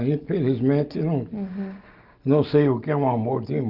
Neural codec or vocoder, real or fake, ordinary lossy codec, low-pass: none; real; Opus, 32 kbps; 5.4 kHz